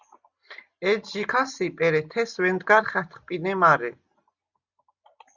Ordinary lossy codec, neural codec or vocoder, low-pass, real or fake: Opus, 64 kbps; none; 7.2 kHz; real